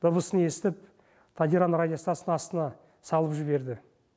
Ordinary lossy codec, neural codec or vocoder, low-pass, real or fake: none; none; none; real